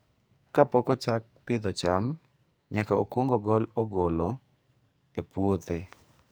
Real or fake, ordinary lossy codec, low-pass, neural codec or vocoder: fake; none; none; codec, 44.1 kHz, 2.6 kbps, SNAC